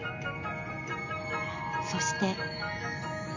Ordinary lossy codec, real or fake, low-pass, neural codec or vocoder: none; real; 7.2 kHz; none